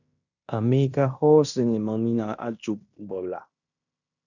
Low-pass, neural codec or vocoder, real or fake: 7.2 kHz; codec, 16 kHz in and 24 kHz out, 0.9 kbps, LongCat-Audio-Codec, fine tuned four codebook decoder; fake